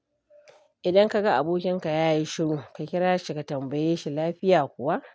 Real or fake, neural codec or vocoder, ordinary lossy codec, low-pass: real; none; none; none